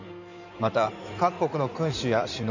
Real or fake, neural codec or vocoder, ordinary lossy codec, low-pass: fake; codec, 16 kHz in and 24 kHz out, 2.2 kbps, FireRedTTS-2 codec; none; 7.2 kHz